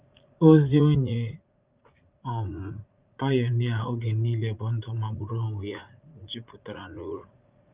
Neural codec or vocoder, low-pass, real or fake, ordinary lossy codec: vocoder, 44.1 kHz, 80 mel bands, Vocos; 3.6 kHz; fake; Opus, 24 kbps